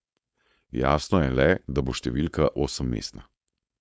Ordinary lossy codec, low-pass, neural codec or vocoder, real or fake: none; none; codec, 16 kHz, 4.8 kbps, FACodec; fake